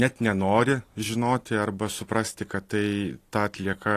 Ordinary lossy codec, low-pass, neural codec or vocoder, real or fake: AAC, 48 kbps; 14.4 kHz; none; real